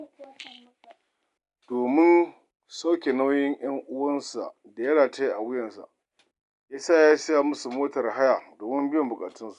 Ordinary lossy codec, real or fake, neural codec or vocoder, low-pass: none; real; none; 10.8 kHz